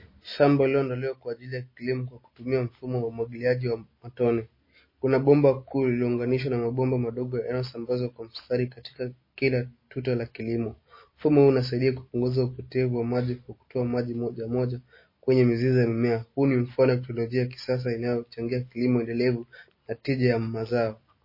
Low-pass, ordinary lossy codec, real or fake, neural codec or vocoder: 5.4 kHz; MP3, 24 kbps; real; none